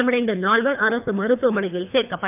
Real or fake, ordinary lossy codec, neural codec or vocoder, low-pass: fake; none; codec, 24 kHz, 3 kbps, HILCodec; 3.6 kHz